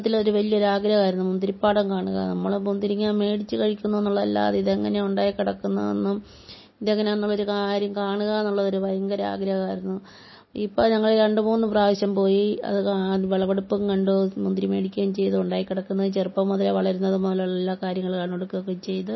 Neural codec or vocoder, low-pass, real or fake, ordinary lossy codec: none; 7.2 kHz; real; MP3, 24 kbps